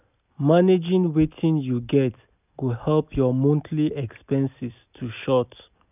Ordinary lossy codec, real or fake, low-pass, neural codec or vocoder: none; real; 3.6 kHz; none